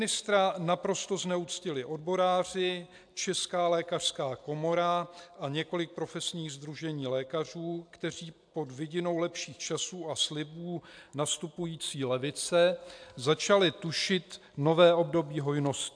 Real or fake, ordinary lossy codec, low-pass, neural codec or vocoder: real; AAC, 64 kbps; 9.9 kHz; none